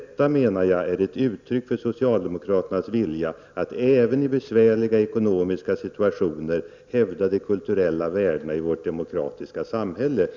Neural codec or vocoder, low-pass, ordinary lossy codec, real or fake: none; 7.2 kHz; none; real